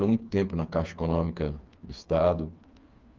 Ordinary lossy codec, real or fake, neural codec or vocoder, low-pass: Opus, 24 kbps; fake; codec, 16 kHz, 4 kbps, FreqCodec, smaller model; 7.2 kHz